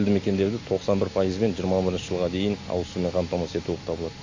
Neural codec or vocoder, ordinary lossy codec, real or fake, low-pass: none; MP3, 32 kbps; real; 7.2 kHz